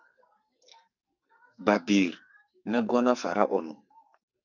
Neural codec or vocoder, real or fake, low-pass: codec, 44.1 kHz, 2.6 kbps, SNAC; fake; 7.2 kHz